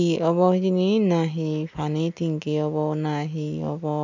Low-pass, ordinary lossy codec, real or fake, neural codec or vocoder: 7.2 kHz; none; real; none